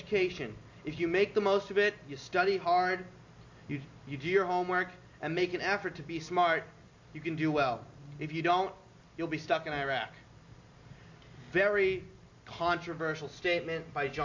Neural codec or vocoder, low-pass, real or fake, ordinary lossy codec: none; 7.2 kHz; real; MP3, 48 kbps